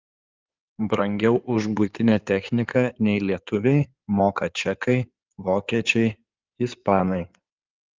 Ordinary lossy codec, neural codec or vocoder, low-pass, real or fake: Opus, 24 kbps; codec, 16 kHz in and 24 kHz out, 2.2 kbps, FireRedTTS-2 codec; 7.2 kHz; fake